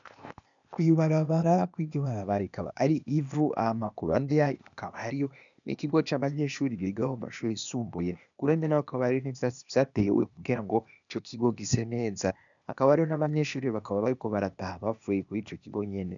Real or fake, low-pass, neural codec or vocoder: fake; 7.2 kHz; codec, 16 kHz, 0.8 kbps, ZipCodec